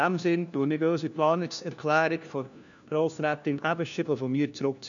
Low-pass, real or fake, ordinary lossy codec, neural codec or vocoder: 7.2 kHz; fake; none; codec, 16 kHz, 1 kbps, FunCodec, trained on LibriTTS, 50 frames a second